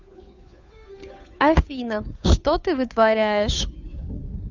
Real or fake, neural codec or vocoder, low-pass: fake; codec, 16 kHz, 2 kbps, FunCodec, trained on Chinese and English, 25 frames a second; 7.2 kHz